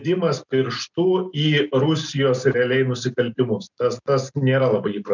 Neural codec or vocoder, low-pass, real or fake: none; 7.2 kHz; real